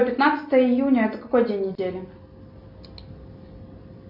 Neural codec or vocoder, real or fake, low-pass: none; real; 5.4 kHz